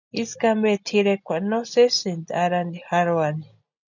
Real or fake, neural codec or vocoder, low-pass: real; none; 7.2 kHz